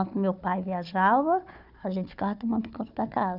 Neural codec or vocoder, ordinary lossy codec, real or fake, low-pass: codec, 16 kHz, 4 kbps, FunCodec, trained on Chinese and English, 50 frames a second; none; fake; 5.4 kHz